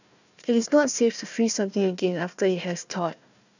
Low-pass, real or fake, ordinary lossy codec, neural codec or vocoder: 7.2 kHz; fake; none; codec, 16 kHz, 1 kbps, FunCodec, trained on Chinese and English, 50 frames a second